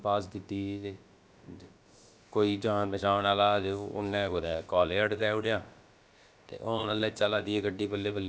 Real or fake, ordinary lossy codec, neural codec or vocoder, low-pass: fake; none; codec, 16 kHz, about 1 kbps, DyCAST, with the encoder's durations; none